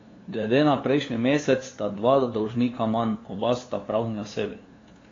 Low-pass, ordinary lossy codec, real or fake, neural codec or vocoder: 7.2 kHz; AAC, 32 kbps; fake; codec, 16 kHz, 2 kbps, FunCodec, trained on LibriTTS, 25 frames a second